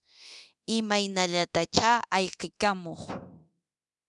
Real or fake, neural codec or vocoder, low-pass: fake; codec, 24 kHz, 0.9 kbps, DualCodec; 10.8 kHz